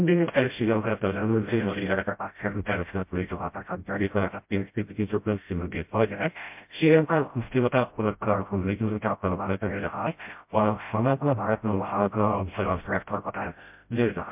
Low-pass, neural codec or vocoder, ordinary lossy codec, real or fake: 3.6 kHz; codec, 16 kHz, 0.5 kbps, FreqCodec, smaller model; MP3, 32 kbps; fake